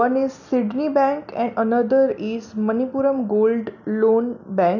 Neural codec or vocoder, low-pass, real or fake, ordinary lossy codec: none; 7.2 kHz; real; none